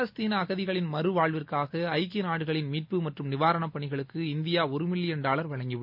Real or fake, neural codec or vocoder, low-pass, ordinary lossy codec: real; none; 5.4 kHz; MP3, 48 kbps